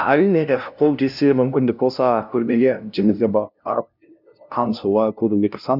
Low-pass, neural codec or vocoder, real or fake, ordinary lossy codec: 5.4 kHz; codec, 16 kHz, 0.5 kbps, FunCodec, trained on LibriTTS, 25 frames a second; fake; none